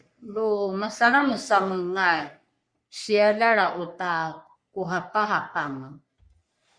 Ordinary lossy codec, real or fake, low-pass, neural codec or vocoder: Opus, 64 kbps; fake; 9.9 kHz; codec, 44.1 kHz, 3.4 kbps, Pupu-Codec